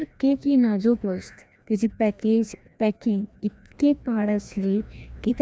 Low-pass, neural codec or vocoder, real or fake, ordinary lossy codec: none; codec, 16 kHz, 1 kbps, FreqCodec, larger model; fake; none